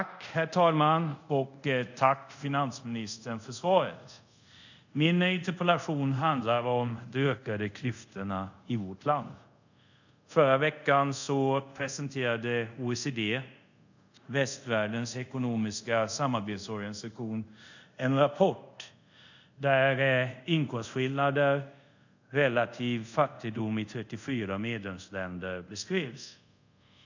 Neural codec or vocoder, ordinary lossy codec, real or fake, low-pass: codec, 24 kHz, 0.5 kbps, DualCodec; none; fake; 7.2 kHz